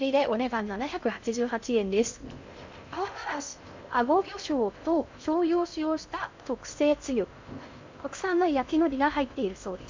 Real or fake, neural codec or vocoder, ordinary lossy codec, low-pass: fake; codec, 16 kHz in and 24 kHz out, 0.6 kbps, FocalCodec, streaming, 2048 codes; MP3, 64 kbps; 7.2 kHz